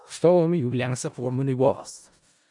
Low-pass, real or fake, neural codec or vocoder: 10.8 kHz; fake; codec, 16 kHz in and 24 kHz out, 0.4 kbps, LongCat-Audio-Codec, four codebook decoder